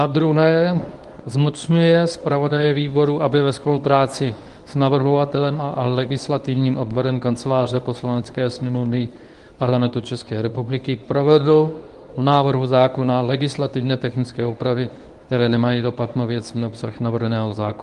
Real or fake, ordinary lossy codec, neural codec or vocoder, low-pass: fake; Opus, 24 kbps; codec, 24 kHz, 0.9 kbps, WavTokenizer, medium speech release version 1; 10.8 kHz